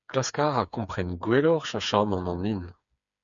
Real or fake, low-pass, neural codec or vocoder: fake; 7.2 kHz; codec, 16 kHz, 4 kbps, FreqCodec, smaller model